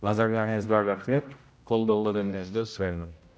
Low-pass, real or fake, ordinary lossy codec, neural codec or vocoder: none; fake; none; codec, 16 kHz, 0.5 kbps, X-Codec, HuBERT features, trained on general audio